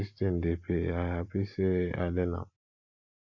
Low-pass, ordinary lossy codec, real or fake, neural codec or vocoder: 7.2 kHz; AAC, 48 kbps; real; none